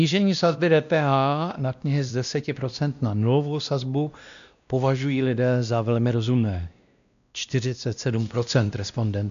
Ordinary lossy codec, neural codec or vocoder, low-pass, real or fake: AAC, 96 kbps; codec, 16 kHz, 1 kbps, X-Codec, WavLM features, trained on Multilingual LibriSpeech; 7.2 kHz; fake